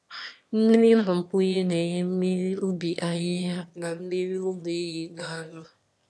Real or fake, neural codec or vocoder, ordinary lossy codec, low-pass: fake; autoencoder, 22.05 kHz, a latent of 192 numbers a frame, VITS, trained on one speaker; none; none